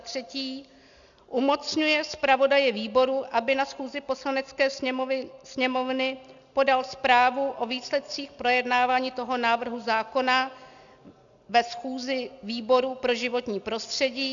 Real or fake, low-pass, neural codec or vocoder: real; 7.2 kHz; none